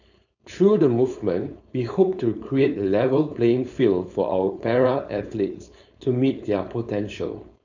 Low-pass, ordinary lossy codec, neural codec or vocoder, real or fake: 7.2 kHz; none; codec, 16 kHz, 4.8 kbps, FACodec; fake